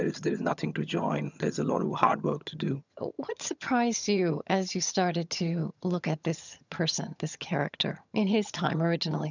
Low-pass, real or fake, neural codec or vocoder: 7.2 kHz; fake; vocoder, 22.05 kHz, 80 mel bands, HiFi-GAN